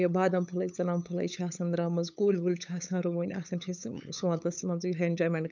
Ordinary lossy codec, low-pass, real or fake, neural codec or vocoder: none; 7.2 kHz; fake; codec, 16 kHz, 8 kbps, FunCodec, trained on LibriTTS, 25 frames a second